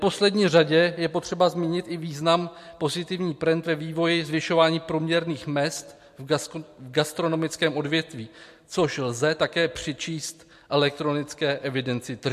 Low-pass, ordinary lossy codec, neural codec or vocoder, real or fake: 14.4 kHz; MP3, 64 kbps; none; real